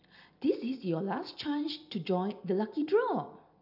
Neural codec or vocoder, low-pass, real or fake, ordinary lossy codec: vocoder, 44.1 kHz, 128 mel bands every 256 samples, BigVGAN v2; 5.4 kHz; fake; none